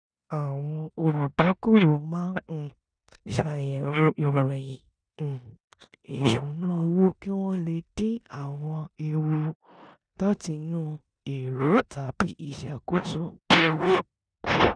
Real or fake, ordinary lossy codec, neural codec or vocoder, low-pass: fake; none; codec, 16 kHz in and 24 kHz out, 0.9 kbps, LongCat-Audio-Codec, four codebook decoder; 9.9 kHz